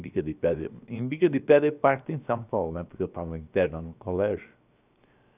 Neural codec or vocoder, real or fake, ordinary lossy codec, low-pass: codec, 16 kHz, 0.7 kbps, FocalCodec; fake; none; 3.6 kHz